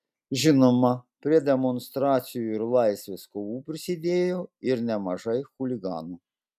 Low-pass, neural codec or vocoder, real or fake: 14.4 kHz; none; real